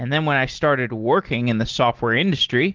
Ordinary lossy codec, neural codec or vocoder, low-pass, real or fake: Opus, 16 kbps; none; 7.2 kHz; real